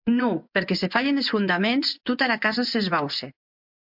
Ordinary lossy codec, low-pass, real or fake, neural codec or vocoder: MP3, 48 kbps; 5.4 kHz; fake; vocoder, 24 kHz, 100 mel bands, Vocos